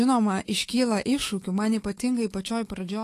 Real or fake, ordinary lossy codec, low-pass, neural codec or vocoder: fake; AAC, 48 kbps; 14.4 kHz; autoencoder, 48 kHz, 128 numbers a frame, DAC-VAE, trained on Japanese speech